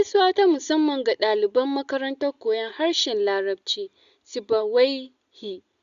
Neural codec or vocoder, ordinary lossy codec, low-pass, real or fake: none; Opus, 64 kbps; 7.2 kHz; real